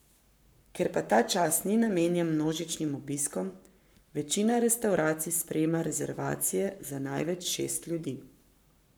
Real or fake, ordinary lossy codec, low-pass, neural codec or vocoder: fake; none; none; codec, 44.1 kHz, 7.8 kbps, Pupu-Codec